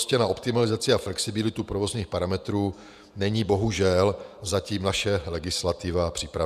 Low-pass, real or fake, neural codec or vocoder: 14.4 kHz; fake; vocoder, 48 kHz, 128 mel bands, Vocos